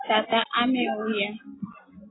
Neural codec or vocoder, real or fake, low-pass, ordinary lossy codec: none; real; 7.2 kHz; AAC, 16 kbps